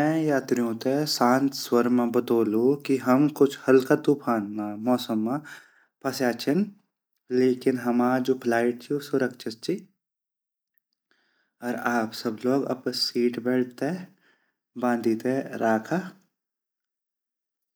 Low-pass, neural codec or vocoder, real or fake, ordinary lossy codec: none; none; real; none